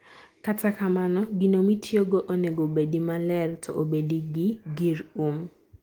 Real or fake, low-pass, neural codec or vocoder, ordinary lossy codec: real; 19.8 kHz; none; Opus, 24 kbps